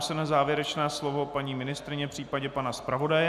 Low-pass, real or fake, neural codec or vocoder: 14.4 kHz; real; none